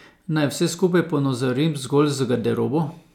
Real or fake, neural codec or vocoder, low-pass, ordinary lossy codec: real; none; 19.8 kHz; none